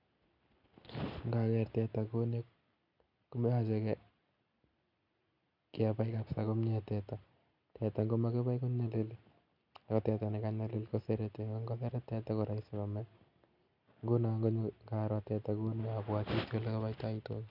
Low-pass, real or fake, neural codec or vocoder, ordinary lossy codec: 5.4 kHz; real; none; none